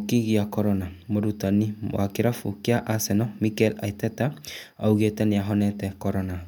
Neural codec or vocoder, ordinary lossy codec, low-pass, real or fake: none; MP3, 96 kbps; 19.8 kHz; real